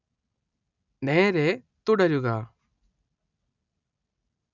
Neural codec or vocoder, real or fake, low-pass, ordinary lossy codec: none; real; 7.2 kHz; none